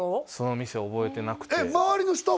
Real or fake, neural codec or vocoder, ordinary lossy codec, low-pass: real; none; none; none